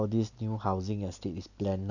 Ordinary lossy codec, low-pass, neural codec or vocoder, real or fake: none; 7.2 kHz; none; real